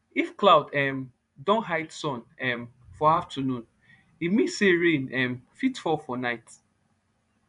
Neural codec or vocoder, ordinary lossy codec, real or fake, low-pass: vocoder, 24 kHz, 100 mel bands, Vocos; none; fake; 10.8 kHz